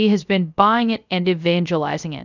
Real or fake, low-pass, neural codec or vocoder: fake; 7.2 kHz; codec, 16 kHz, 0.3 kbps, FocalCodec